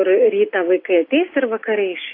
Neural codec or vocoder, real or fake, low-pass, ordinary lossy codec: none; real; 5.4 kHz; AAC, 32 kbps